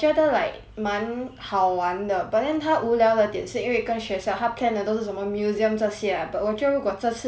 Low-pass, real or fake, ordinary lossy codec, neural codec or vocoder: none; real; none; none